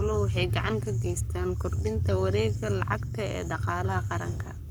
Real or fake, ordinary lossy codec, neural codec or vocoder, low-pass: fake; none; codec, 44.1 kHz, 7.8 kbps, Pupu-Codec; none